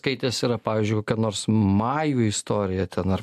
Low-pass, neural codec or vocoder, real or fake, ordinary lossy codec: 14.4 kHz; none; real; MP3, 96 kbps